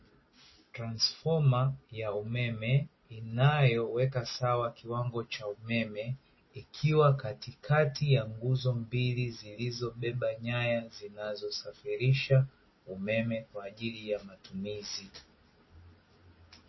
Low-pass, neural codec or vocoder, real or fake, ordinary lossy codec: 7.2 kHz; none; real; MP3, 24 kbps